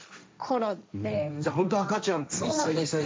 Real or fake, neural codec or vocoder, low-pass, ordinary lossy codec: fake; codec, 16 kHz, 1.1 kbps, Voila-Tokenizer; none; none